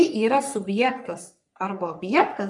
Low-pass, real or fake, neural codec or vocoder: 10.8 kHz; fake; codec, 44.1 kHz, 3.4 kbps, Pupu-Codec